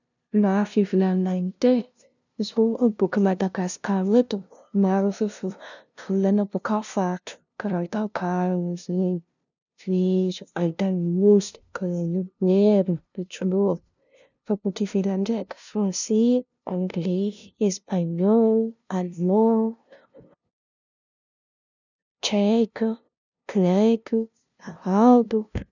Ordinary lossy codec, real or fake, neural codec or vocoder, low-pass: none; fake; codec, 16 kHz, 0.5 kbps, FunCodec, trained on LibriTTS, 25 frames a second; 7.2 kHz